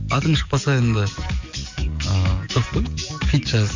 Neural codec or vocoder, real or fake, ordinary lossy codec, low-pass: codec, 44.1 kHz, 7.8 kbps, DAC; fake; MP3, 64 kbps; 7.2 kHz